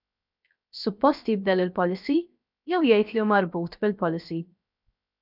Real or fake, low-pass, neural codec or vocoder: fake; 5.4 kHz; codec, 16 kHz, 0.7 kbps, FocalCodec